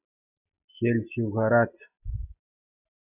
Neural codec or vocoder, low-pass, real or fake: none; 3.6 kHz; real